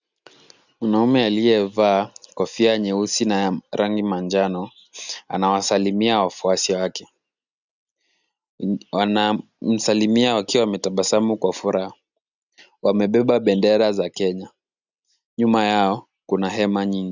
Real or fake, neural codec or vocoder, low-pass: real; none; 7.2 kHz